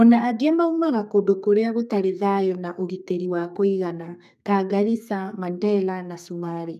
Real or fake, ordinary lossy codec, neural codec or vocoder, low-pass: fake; none; codec, 32 kHz, 1.9 kbps, SNAC; 14.4 kHz